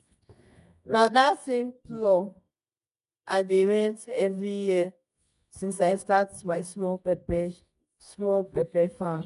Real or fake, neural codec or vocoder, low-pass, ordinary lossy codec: fake; codec, 24 kHz, 0.9 kbps, WavTokenizer, medium music audio release; 10.8 kHz; none